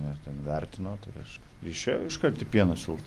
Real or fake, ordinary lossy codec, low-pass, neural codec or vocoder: fake; Opus, 32 kbps; 14.4 kHz; vocoder, 44.1 kHz, 128 mel bands every 256 samples, BigVGAN v2